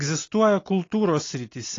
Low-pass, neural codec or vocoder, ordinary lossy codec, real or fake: 7.2 kHz; none; AAC, 32 kbps; real